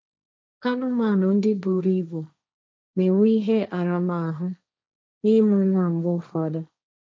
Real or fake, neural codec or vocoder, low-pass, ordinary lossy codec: fake; codec, 16 kHz, 1.1 kbps, Voila-Tokenizer; none; none